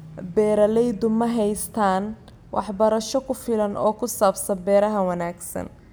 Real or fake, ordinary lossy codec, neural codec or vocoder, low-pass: real; none; none; none